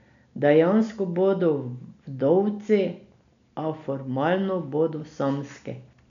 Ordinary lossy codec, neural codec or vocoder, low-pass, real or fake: none; none; 7.2 kHz; real